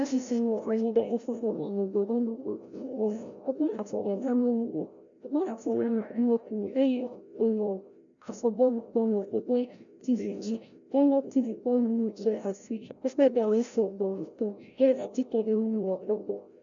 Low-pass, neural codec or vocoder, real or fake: 7.2 kHz; codec, 16 kHz, 0.5 kbps, FreqCodec, larger model; fake